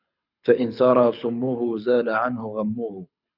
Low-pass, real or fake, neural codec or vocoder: 5.4 kHz; fake; codec, 24 kHz, 6 kbps, HILCodec